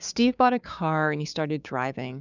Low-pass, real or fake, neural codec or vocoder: 7.2 kHz; fake; codec, 16 kHz, 6 kbps, DAC